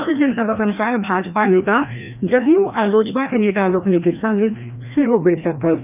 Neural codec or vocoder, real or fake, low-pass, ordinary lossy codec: codec, 16 kHz, 1 kbps, FreqCodec, larger model; fake; 3.6 kHz; none